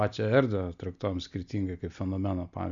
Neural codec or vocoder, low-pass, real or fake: none; 7.2 kHz; real